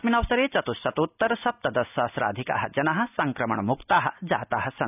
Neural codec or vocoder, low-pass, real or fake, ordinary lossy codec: none; 3.6 kHz; real; none